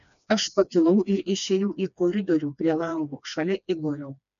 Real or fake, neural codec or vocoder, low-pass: fake; codec, 16 kHz, 2 kbps, FreqCodec, smaller model; 7.2 kHz